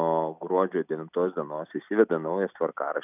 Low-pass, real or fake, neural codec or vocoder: 3.6 kHz; real; none